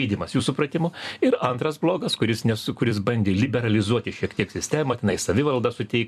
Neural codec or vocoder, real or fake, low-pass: vocoder, 44.1 kHz, 128 mel bands every 256 samples, BigVGAN v2; fake; 14.4 kHz